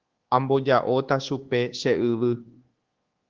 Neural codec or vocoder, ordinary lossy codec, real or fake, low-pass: codec, 24 kHz, 1.2 kbps, DualCodec; Opus, 16 kbps; fake; 7.2 kHz